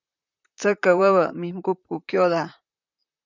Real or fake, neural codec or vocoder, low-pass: fake; vocoder, 44.1 kHz, 128 mel bands, Pupu-Vocoder; 7.2 kHz